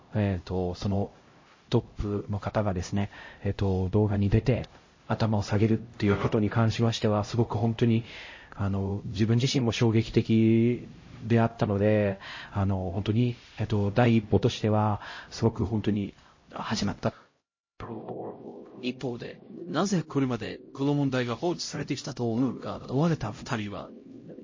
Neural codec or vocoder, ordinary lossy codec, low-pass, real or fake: codec, 16 kHz, 0.5 kbps, X-Codec, HuBERT features, trained on LibriSpeech; MP3, 32 kbps; 7.2 kHz; fake